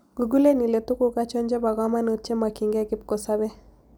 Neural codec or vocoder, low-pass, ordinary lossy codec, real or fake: none; none; none; real